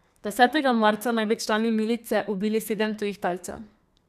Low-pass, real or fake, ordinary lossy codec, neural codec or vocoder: 14.4 kHz; fake; none; codec, 32 kHz, 1.9 kbps, SNAC